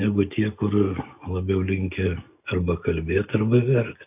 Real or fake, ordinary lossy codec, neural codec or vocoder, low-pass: real; AAC, 32 kbps; none; 3.6 kHz